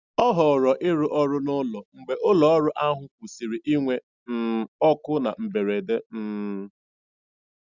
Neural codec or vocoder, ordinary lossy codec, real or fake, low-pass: none; Opus, 64 kbps; real; 7.2 kHz